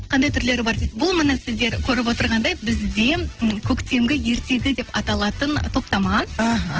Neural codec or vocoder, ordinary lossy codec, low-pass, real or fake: none; Opus, 16 kbps; 7.2 kHz; real